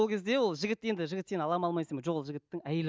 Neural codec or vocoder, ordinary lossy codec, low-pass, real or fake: none; none; none; real